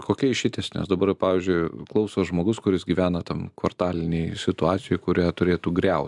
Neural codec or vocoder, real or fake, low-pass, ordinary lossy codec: none; real; 10.8 kHz; MP3, 96 kbps